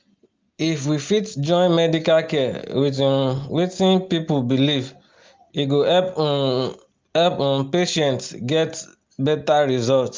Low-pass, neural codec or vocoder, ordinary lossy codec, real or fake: 7.2 kHz; none; Opus, 24 kbps; real